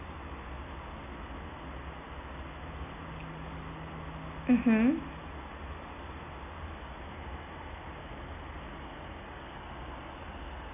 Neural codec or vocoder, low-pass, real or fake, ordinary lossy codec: none; 3.6 kHz; real; none